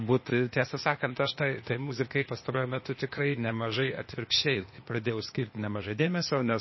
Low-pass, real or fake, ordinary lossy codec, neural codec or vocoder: 7.2 kHz; fake; MP3, 24 kbps; codec, 16 kHz, 0.8 kbps, ZipCodec